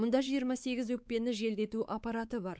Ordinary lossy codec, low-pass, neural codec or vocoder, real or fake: none; none; codec, 16 kHz, 4 kbps, X-Codec, WavLM features, trained on Multilingual LibriSpeech; fake